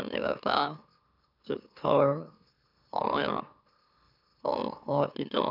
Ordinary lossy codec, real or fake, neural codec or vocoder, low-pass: none; fake; autoencoder, 44.1 kHz, a latent of 192 numbers a frame, MeloTTS; 5.4 kHz